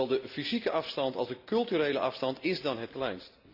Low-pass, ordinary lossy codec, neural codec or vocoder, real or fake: 5.4 kHz; AAC, 48 kbps; none; real